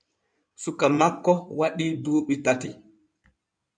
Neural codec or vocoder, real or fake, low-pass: codec, 16 kHz in and 24 kHz out, 2.2 kbps, FireRedTTS-2 codec; fake; 9.9 kHz